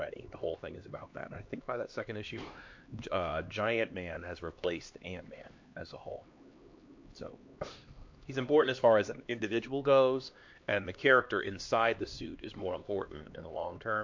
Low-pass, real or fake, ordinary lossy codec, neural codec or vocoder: 7.2 kHz; fake; MP3, 48 kbps; codec, 16 kHz, 2 kbps, X-Codec, HuBERT features, trained on LibriSpeech